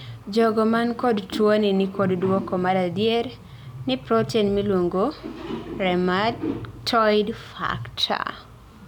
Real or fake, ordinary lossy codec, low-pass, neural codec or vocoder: real; none; 19.8 kHz; none